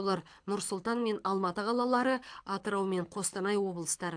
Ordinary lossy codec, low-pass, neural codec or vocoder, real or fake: AAC, 64 kbps; 9.9 kHz; codec, 24 kHz, 6 kbps, HILCodec; fake